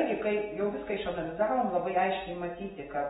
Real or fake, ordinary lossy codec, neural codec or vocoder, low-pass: real; AAC, 16 kbps; none; 19.8 kHz